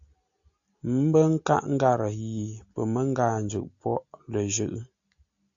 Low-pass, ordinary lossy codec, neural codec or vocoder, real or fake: 7.2 kHz; AAC, 64 kbps; none; real